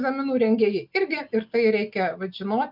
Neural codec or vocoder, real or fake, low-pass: none; real; 5.4 kHz